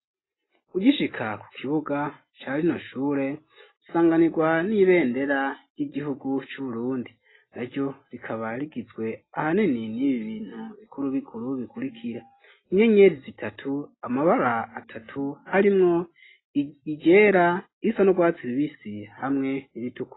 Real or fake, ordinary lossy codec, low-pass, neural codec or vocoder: real; AAC, 16 kbps; 7.2 kHz; none